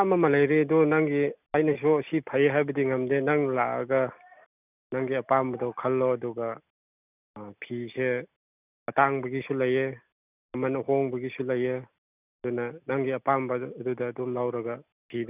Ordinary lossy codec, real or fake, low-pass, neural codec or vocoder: none; real; 3.6 kHz; none